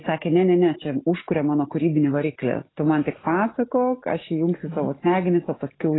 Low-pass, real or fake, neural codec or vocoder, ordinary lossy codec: 7.2 kHz; real; none; AAC, 16 kbps